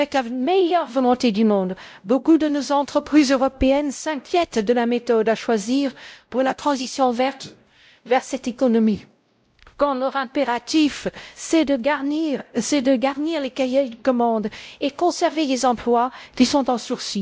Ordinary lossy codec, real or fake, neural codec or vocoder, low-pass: none; fake; codec, 16 kHz, 0.5 kbps, X-Codec, WavLM features, trained on Multilingual LibriSpeech; none